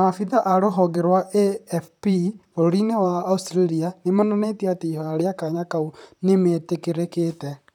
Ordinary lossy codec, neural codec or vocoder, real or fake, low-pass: none; vocoder, 44.1 kHz, 128 mel bands, Pupu-Vocoder; fake; 19.8 kHz